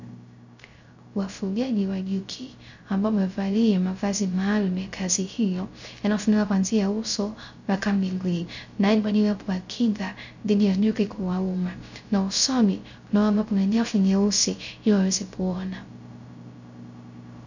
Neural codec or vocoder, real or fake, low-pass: codec, 16 kHz, 0.3 kbps, FocalCodec; fake; 7.2 kHz